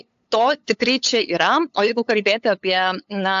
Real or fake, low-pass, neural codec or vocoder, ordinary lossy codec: fake; 7.2 kHz; codec, 16 kHz, 8 kbps, FunCodec, trained on LibriTTS, 25 frames a second; AAC, 64 kbps